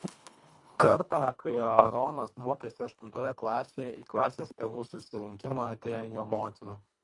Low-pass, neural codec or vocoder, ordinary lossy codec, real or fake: 10.8 kHz; codec, 24 kHz, 1.5 kbps, HILCodec; MP3, 64 kbps; fake